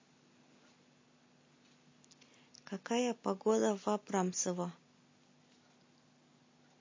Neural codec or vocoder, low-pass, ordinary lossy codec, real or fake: none; 7.2 kHz; MP3, 32 kbps; real